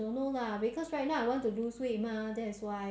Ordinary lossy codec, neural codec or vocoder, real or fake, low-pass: none; none; real; none